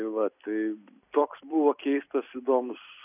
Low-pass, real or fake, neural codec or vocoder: 3.6 kHz; fake; vocoder, 44.1 kHz, 128 mel bands every 256 samples, BigVGAN v2